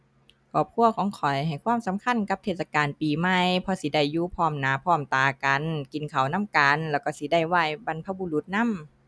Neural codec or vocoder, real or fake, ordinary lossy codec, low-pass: none; real; none; none